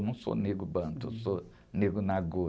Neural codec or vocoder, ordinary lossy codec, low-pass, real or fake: none; none; none; real